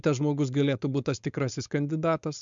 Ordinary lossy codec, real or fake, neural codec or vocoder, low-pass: MP3, 64 kbps; fake; codec, 16 kHz, 4.8 kbps, FACodec; 7.2 kHz